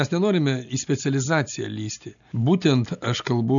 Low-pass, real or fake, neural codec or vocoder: 7.2 kHz; real; none